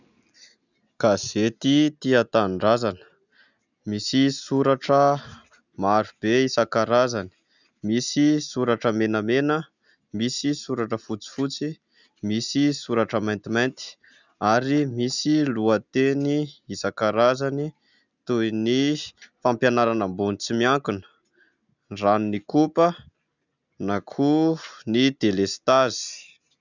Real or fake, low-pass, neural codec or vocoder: real; 7.2 kHz; none